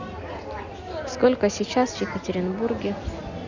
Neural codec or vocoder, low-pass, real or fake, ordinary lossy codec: none; 7.2 kHz; real; none